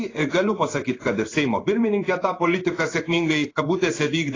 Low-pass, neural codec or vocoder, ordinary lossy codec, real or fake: 7.2 kHz; codec, 16 kHz in and 24 kHz out, 1 kbps, XY-Tokenizer; AAC, 32 kbps; fake